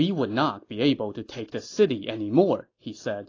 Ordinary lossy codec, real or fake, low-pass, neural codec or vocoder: AAC, 32 kbps; real; 7.2 kHz; none